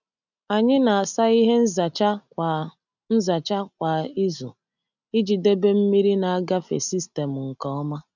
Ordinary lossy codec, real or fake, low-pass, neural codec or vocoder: none; real; 7.2 kHz; none